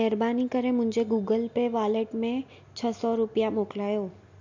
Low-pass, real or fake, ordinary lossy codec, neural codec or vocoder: 7.2 kHz; real; MP3, 48 kbps; none